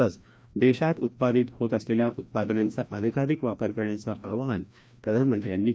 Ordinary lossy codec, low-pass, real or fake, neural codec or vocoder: none; none; fake; codec, 16 kHz, 1 kbps, FreqCodec, larger model